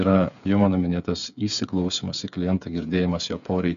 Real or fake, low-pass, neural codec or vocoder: fake; 7.2 kHz; codec, 16 kHz, 8 kbps, FreqCodec, smaller model